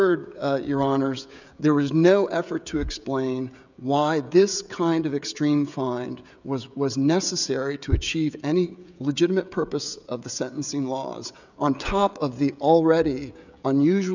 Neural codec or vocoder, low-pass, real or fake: codec, 16 kHz, 16 kbps, FreqCodec, smaller model; 7.2 kHz; fake